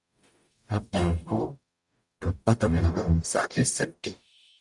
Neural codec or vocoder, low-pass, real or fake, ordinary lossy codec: codec, 44.1 kHz, 0.9 kbps, DAC; 10.8 kHz; fake; Opus, 64 kbps